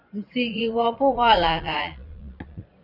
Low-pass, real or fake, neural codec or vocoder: 5.4 kHz; fake; vocoder, 22.05 kHz, 80 mel bands, Vocos